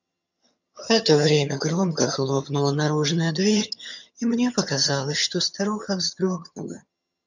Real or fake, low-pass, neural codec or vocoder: fake; 7.2 kHz; vocoder, 22.05 kHz, 80 mel bands, HiFi-GAN